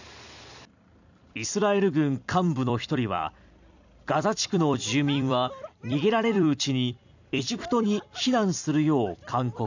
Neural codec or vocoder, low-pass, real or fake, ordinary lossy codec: vocoder, 44.1 kHz, 128 mel bands every 256 samples, BigVGAN v2; 7.2 kHz; fake; none